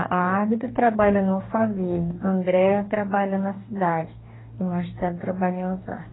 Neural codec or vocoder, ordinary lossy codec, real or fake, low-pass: codec, 44.1 kHz, 2.6 kbps, DAC; AAC, 16 kbps; fake; 7.2 kHz